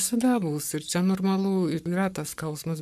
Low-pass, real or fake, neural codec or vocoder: 14.4 kHz; fake; codec, 44.1 kHz, 7.8 kbps, Pupu-Codec